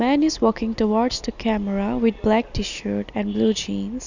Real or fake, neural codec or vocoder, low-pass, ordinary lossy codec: real; none; 7.2 kHz; none